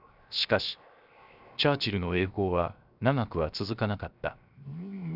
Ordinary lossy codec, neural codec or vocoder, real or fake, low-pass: none; codec, 16 kHz, 0.7 kbps, FocalCodec; fake; 5.4 kHz